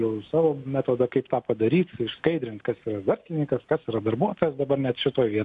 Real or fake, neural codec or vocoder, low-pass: real; none; 10.8 kHz